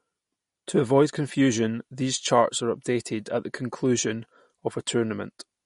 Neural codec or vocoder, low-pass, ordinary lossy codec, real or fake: none; 10.8 kHz; MP3, 48 kbps; real